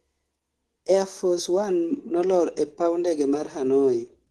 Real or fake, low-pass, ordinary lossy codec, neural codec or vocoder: fake; 10.8 kHz; Opus, 16 kbps; codec, 24 kHz, 3.1 kbps, DualCodec